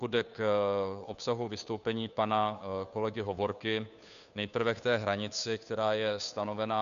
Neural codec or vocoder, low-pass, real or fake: codec, 16 kHz, 2 kbps, FunCodec, trained on Chinese and English, 25 frames a second; 7.2 kHz; fake